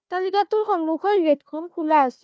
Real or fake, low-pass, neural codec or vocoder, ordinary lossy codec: fake; none; codec, 16 kHz, 1 kbps, FunCodec, trained on Chinese and English, 50 frames a second; none